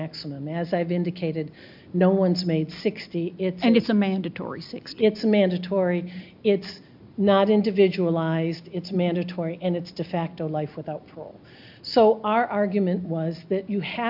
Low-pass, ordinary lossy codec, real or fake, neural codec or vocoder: 5.4 kHz; MP3, 48 kbps; real; none